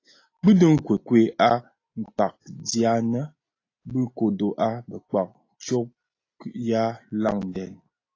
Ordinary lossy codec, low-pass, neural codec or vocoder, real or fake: AAC, 32 kbps; 7.2 kHz; none; real